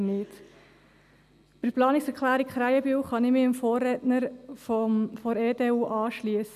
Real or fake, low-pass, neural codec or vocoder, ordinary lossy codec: real; 14.4 kHz; none; none